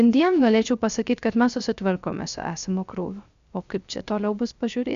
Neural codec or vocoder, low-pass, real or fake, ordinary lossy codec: codec, 16 kHz, 0.3 kbps, FocalCodec; 7.2 kHz; fake; Opus, 64 kbps